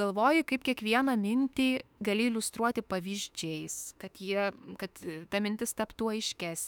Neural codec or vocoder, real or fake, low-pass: autoencoder, 48 kHz, 32 numbers a frame, DAC-VAE, trained on Japanese speech; fake; 19.8 kHz